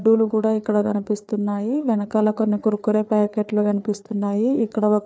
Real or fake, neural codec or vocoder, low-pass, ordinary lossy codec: fake; codec, 16 kHz, 4 kbps, FreqCodec, larger model; none; none